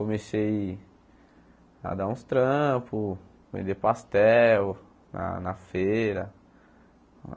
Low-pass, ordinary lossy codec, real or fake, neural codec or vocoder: none; none; real; none